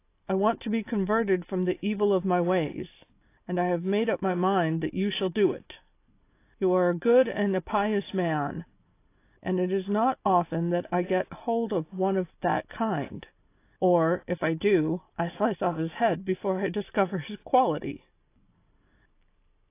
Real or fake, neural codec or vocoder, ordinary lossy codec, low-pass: real; none; AAC, 24 kbps; 3.6 kHz